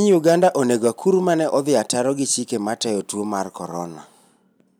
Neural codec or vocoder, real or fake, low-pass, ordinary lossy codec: none; real; none; none